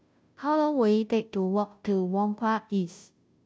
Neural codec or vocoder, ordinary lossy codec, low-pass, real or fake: codec, 16 kHz, 0.5 kbps, FunCodec, trained on Chinese and English, 25 frames a second; none; none; fake